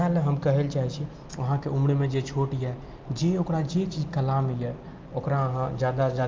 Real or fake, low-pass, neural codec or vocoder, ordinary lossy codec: real; 7.2 kHz; none; Opus, 24 kbps